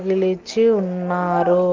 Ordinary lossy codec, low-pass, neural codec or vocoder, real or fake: Opus, 16 kbps; 7.2 kHz; none; real